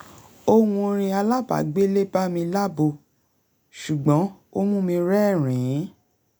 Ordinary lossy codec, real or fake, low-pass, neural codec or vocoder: none; real; none; none